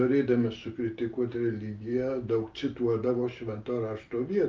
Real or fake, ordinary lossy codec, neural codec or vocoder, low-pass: real; Opus, 24 kbps; none; 7.2 kHz